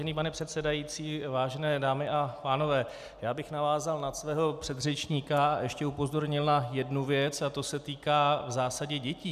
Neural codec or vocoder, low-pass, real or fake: none; 14.4 kHz; real